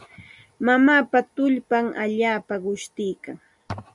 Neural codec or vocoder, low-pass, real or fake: none; 10.8 kHz; real